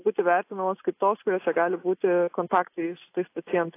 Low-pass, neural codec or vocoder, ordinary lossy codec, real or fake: 3.6 kHz; none; AAC, 24 kbps; real